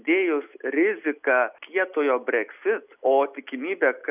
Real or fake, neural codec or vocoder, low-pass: real; none; 3.6 kHz